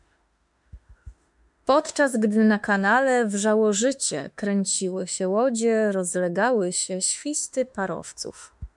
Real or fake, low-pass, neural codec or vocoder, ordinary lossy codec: fake; 10.8 kHz; autoencoder, 48 kHz, 32 numbers a frame, DAC-VAE, trained on Japanese speech; MP3, 96 kbps